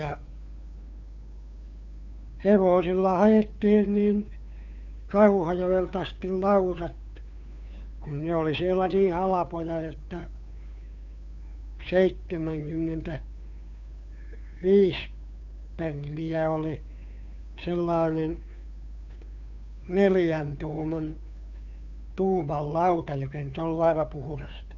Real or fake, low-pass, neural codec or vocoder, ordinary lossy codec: fake; 7.2 kHz; codec, 16 kHz, 2 kbps, FunCodec, trained on Chinese and English, 25 frames a second; none